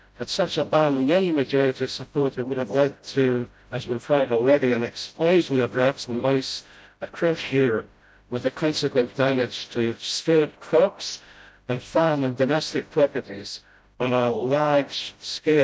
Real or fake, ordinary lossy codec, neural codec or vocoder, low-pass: fake; none; codec, 16 kHz, 0.5 kbps, FreqCodec, smaller model; none